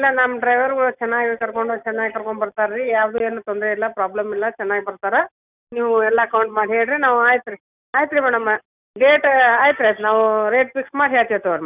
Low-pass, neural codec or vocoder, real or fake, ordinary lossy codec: 3.6 kHz; none; real; none